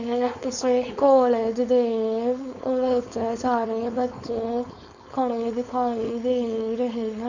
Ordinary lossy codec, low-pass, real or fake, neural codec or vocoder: Opus, 64 kbps; 7.2 kHz; fake; codec, 16 kHz, 4.8 kbps, FACodec